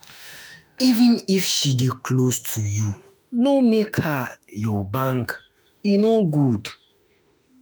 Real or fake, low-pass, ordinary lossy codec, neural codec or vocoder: fake; none; none; autoencoder, 48 kHz, 32 numbers a frame, DAC-VAE, trained on Japanese speech